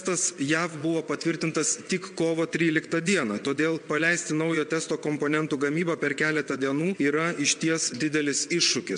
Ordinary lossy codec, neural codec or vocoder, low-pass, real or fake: MP3, 64 kbps; vocoder, 22.05 kHz, 80 mel bands, WaveNeXt; 9.9 kHz; fake